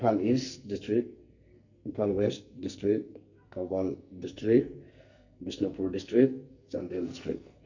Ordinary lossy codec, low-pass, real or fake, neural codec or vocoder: none; 7.2 kHz; fake; codec, 44.1 kHz, 2.6 kbps, SNAC